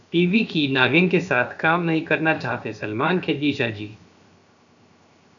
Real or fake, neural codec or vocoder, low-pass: fake; codec, 16 kHz, 0.7 kbps, FocalCodec; 7.2 kHz